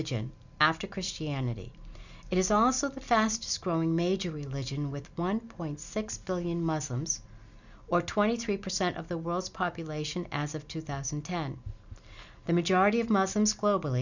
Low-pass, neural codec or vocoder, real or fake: 7.2 kHz; none; real